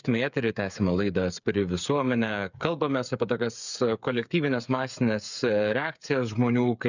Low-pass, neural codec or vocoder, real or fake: 7.2 kHz; codec, 16 kHz, 8 kbps, FreqCodec, smaller model; fake